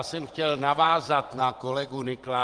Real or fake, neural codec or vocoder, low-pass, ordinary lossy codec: fake; vocoder, 22.05 kHz, 80 mel bands, WaveNeXt; 9.9 kHz; Opus, 24 kbps